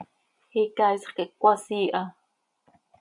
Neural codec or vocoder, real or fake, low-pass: vocoder, 24 kHz, 100 mel bands, Vocos; fake; 10.8 kHz